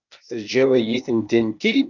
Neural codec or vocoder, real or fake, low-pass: codec, 16 kHz, 0.8 kbps, ZipCodec; fake; 7.2 kHz